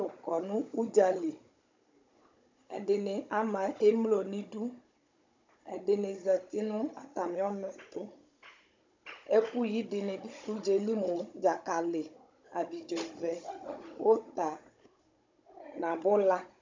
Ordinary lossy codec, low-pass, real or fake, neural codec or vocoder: MP3, 64 kbps; 7.2 kHz; fake; codec, 16 kHz, 16 kbps, FunCodec, trained on Chinese and English, 50 frames a second